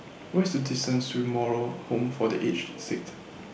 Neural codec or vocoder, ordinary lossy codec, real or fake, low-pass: none; none; real; none